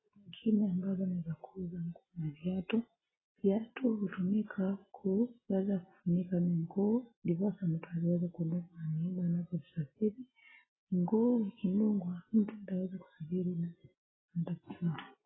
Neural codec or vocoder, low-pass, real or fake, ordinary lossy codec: none; 7.2 kHz; real; AAC, 16 kbps